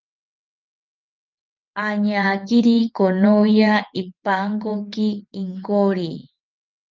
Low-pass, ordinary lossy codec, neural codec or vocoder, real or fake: 7.2 kHz; Opus, 24 kbps; vocoder, 22.05 kHz, 80 mel bands, WaveNeXt; fake